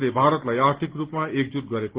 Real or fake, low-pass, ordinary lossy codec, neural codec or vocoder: real; 3.6 kHz; Opus, 16 kbps; none